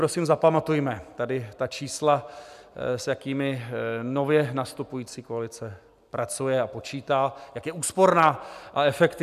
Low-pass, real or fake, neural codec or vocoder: 14.4 kHz; real; none